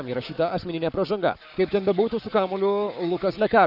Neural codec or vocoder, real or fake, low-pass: codec, 44.1 kHz, 7.8 kbps, Pupu-Codec; fake; 5.4 kHz